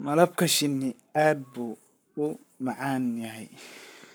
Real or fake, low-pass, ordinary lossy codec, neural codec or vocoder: fake; none; none; codec, 44.1 kHz, 7.8 kbps, Pupu-Codec